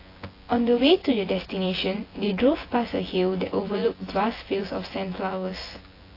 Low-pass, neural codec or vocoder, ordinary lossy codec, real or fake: 5.4 kHz; vocoder, 24 kHz, 100 mel bands, Vocos; AAC, 24 kbps; fake